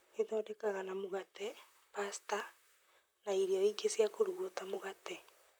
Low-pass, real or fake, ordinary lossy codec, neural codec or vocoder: none; fake; none; vocoder, 44.1 kHz, 128 mel bands, Pupu-Vocoder